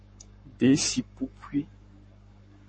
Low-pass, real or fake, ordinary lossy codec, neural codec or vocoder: 9.9 kHz; fake; MP3, 32 kbps; codec, 44.1 kHz, 7.8 kbps, Pupu-Codec